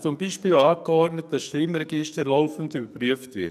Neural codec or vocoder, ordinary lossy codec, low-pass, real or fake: codec, 44.1 kHz, 2.6 kbps, SNAC; none; 14.4 kHz; fake